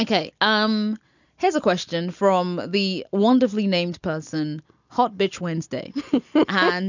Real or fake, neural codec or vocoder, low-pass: real; none; 7.2 kHz